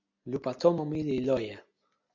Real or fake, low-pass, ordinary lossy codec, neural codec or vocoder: real; 7.2 kHz; MP3, 64 kbps; none